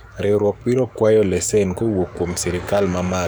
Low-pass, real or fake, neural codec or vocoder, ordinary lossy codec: none; fake; codec, 44.1 kHz, 7.8 kbps, DAC; none